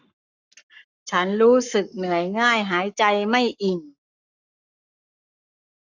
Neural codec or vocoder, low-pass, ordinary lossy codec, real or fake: codec, 44.1 kHz, 7.8 kbps, Pupu-Codec; 7.2 kHz; none; fake